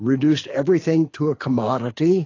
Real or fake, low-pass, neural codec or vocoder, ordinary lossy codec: fake; 7.2 kHz; codec, 24 kHz, 6 kbps, HILCodec; AAC, 32 kbps